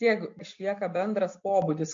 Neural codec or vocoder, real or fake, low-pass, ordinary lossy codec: none; real; 7.2 kHz; MP3, 48 kbps